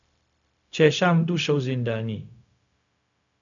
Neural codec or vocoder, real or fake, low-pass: codec, 16 kHz, 0.4 kbps, LongCat-Audio-Codec; fake; 7.2 kHz